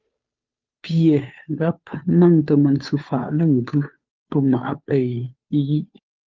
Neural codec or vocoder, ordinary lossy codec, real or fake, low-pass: codec, 16 kHz, 2 kbps, FunCodec, trained on Chinese and English, 25 frames a second; Opus, 32 kbps; fake; 7.2 kHz